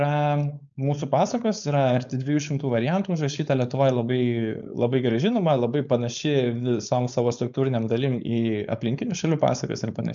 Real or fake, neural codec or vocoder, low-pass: fake; codec, 16 kHz, 4.8 kbps, FACodec; 7.2 kHz